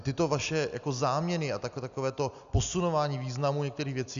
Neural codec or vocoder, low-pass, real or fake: none; 7.2 kHz; real